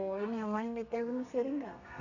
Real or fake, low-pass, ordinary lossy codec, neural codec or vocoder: fake; 7.2 kHz; none; codec, 32 kHz, 1.9 kbps, SNAC